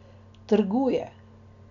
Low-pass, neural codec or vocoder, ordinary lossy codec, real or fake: 7.2 kHz; none; MP3, 96 kbps; real